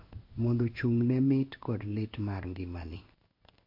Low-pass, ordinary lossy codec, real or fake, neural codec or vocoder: 5.4 kHz; MP3, 32 kbps; fake; codec, 16 kHz in and 24 kHz out, 1 kbps, XY-Tokenizer